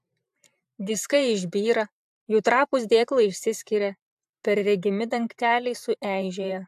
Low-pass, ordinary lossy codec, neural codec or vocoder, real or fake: 14.4 kHz; AAC, 96 kbps; vocoder, 44.1 kHz, 128 mel bands every 512 samples, BigVGAN v2; fake